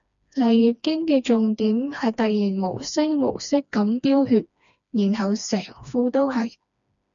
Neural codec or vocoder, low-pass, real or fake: codec, 16 kHz, 2 kbps, FreqCodec, smaller model; 7.2 kHz; fake